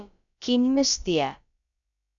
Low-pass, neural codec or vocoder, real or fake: 7.2 kHz; codec, 16 kHz, about 1 kbps, DyCAST, with the encoder's durations; fake